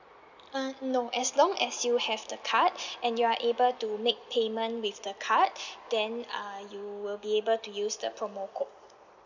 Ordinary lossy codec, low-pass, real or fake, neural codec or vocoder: none; 7.2 kHz; real; none